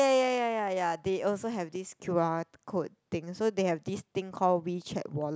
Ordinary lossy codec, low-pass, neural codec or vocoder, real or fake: none; none; none; real